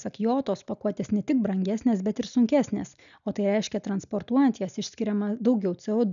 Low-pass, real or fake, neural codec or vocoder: 7.2 kHz; real; none